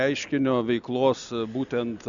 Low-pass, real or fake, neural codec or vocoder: 7.2 kHz; real; none